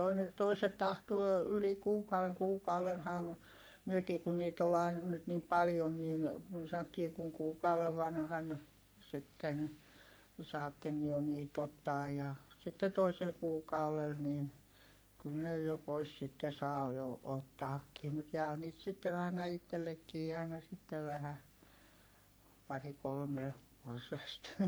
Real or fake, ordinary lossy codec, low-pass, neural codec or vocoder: fake; none; none; codec, 44.1 kHz, 3.4 kbps, Pupu-Codec